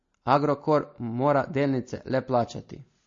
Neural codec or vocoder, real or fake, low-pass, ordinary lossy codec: none; real; 7.2 kHz; MP3, 32 kbps